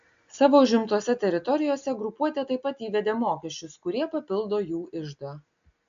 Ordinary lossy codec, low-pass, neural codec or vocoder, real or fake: AAC, 64 kbps; 7.2 kHz; none; real